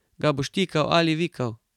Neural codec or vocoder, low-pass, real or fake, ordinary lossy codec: vocoder, 44.1 kHz, 128 mel bands every 512 samples, BigVGAN v2; 19.8 kHz; fake; none